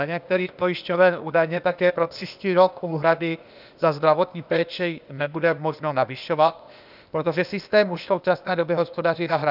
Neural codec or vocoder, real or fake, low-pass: codec, 16 kHz, 0.8 kbps, ZipCodec; fake; 5.4 kHz